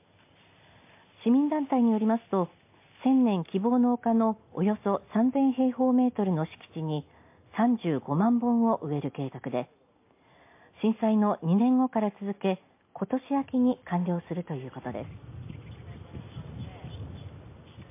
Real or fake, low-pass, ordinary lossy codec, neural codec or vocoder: real; 3.6 kHz; MP3, 24 kbps; none